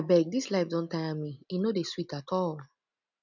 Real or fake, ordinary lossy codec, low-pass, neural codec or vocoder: real; none; 7.2 kHz; none